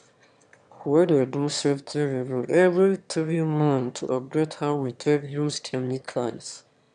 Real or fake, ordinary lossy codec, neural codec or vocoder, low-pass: fake; none; autoencoder, 22.05 kHz, a latent of 192 numbers a frame, VITS, trained on one speaker; 9.9 kHz